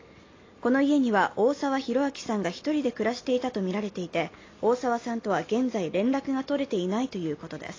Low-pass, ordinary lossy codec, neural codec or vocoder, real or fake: 7.2 kHz; AAC, 32 kbps; none; real